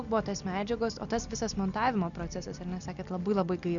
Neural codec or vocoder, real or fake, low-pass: none; real; 7.2 kHz